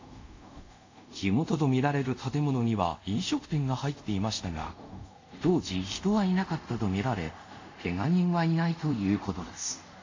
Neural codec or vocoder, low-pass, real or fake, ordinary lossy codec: codec, 24 kHz, 0.5 kbps, DualCodec; 7.2 kHz; fake; AAC, 48 kbps